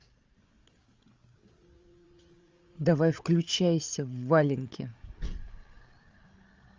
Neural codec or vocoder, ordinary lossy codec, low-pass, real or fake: codec, 16 kHz, 8 kbps, FreqCodec, larger model; Opus, 32 kbps; 7.2 kHz; fake